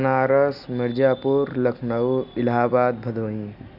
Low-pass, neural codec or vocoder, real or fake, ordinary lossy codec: 5.4 kHz; none; real; none